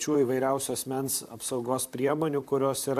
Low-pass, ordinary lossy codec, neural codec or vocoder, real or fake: 14.4 kHz; AAC, 96 kbps; vocoder, 44.1 kHz, 128 mel bands, Pupu-Vocoder; fake